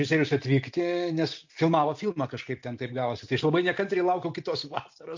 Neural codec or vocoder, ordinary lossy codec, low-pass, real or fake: none; MP3, 48 kbps; 7.2 kHz; real